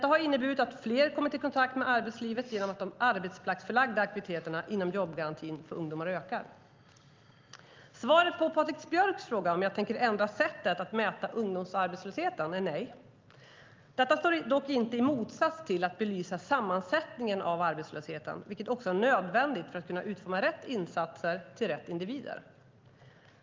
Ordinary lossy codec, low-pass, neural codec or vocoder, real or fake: Opus, 32 kbps; 7.2 kHz; none; real